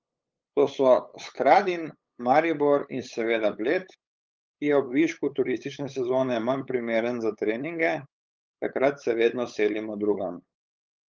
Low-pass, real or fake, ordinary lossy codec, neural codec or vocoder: 7.2 kHz; fake; Opus, 24 kbps; codec, 16 kHz, 8 kbps, FunCodec, trained on LibriTTS, 25 frames a second